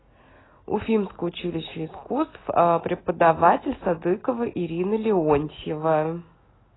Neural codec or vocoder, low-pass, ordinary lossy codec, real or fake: none; 7.2 kHz; AAC, 16 kbps; real